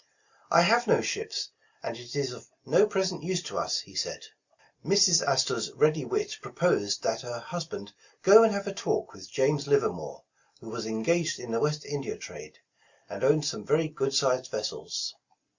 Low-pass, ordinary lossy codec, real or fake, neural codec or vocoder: 7.2 kHz; Opus, 64 kbps; real; none